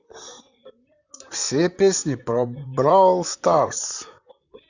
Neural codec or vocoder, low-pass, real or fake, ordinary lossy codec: vocoder, 44.1 kHz, 128 mel bands, Pupu-Vocoder; 7.2 kHz; fake; none